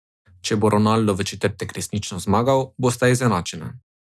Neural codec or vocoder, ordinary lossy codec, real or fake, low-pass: none; none; real; none